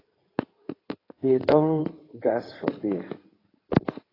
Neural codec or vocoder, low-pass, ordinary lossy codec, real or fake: codec, 16 kHz in and 24 kHz out, 2.2 kbps, FireRedTTS-2 codec; 5.4 kHz; AAC, 24 kbps; fake